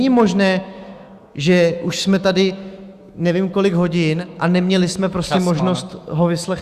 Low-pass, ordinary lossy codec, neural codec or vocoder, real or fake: 14.4 kHz; Opus, 64 kbps; autoencoder, 48 kHz, 128 numbers a frame, DAC-VAE, trained on Japanese speech; fake